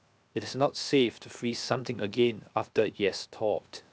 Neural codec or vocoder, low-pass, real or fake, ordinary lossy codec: codec, 16 kHz, 0.7 kbps, FocalCodec; none; fake; none